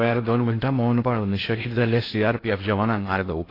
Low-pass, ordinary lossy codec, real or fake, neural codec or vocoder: 5.4 kHz; AAC, 24 kbps; fake; codec, 16 kHz in and 24 kHz out, 0.6 kbps, FocalCodec, streaming, 2048 codes